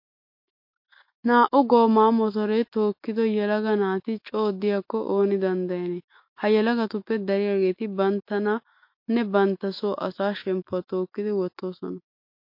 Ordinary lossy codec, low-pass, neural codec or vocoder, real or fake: MP3, 32 kbps; 5.4 kHz; autoencoder, 48 kHz, 128 numbers a frame, DAC-VAE, trained on Japanese speech; fake